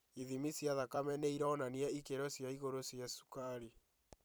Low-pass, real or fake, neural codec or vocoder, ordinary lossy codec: none; fake; vocoder, 44.1 kHz, 128 mel bands every 256 samples, BigVGAN v2; none